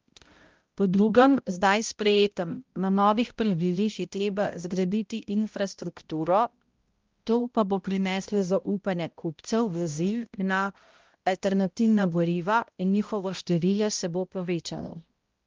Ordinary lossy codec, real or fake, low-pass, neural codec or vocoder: Opus, 32 kbps; fake; 7.2 kHz; codec, 16 kHz, 0.5 kbps, X-Codec, HuBERT features, trained on balanced general audio